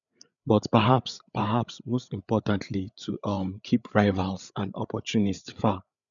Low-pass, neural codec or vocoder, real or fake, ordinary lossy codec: 7.2 kHz; codec, 16 kHz, 8 kbps, FreqCodec, larger model; fake; none